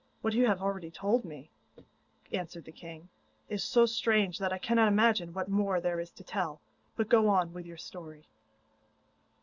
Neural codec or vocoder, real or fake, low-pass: none; real; 7.2 kHz